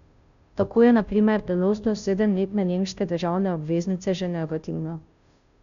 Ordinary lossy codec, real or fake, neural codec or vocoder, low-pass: none; fake; codec, 16 kHz, 0.5 kbps, FunCodec, trained on Chinese and English, 25 frames a second; 7.2 kHz